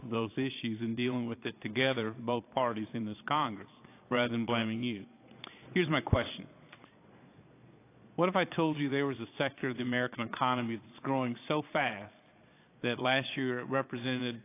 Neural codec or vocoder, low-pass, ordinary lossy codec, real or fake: vocoder, 22.05 kHz, 80 mel bands, Vocos; 3.6 kHz; AAC, 24 kbps; fake